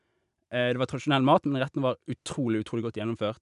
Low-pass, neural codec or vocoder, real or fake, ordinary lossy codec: 10.8 kHz; none; real; none